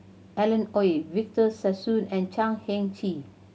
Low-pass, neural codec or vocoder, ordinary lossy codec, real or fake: none; none; none; real